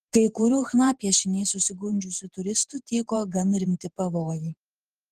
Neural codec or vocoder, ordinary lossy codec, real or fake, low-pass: vocoder, 48 kHz, 128 mel bands, Vocos; Opus, 16 kbps; fake; 14.4 kHz